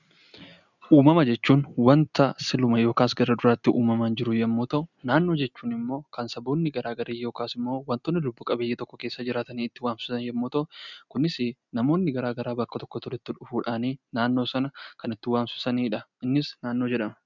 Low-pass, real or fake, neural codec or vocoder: 7.2 kHz; real; none